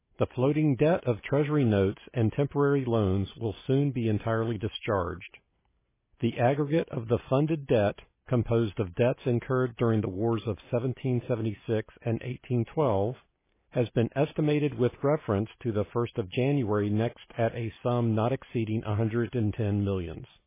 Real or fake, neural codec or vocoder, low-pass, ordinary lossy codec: real; none; 3.6 kHz; MP3, 16 kbps